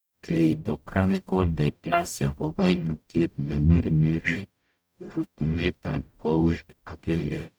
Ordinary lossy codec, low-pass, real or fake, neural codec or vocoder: none; none; fake; codec, 44.1 kHz, 0.9 kbps, DAC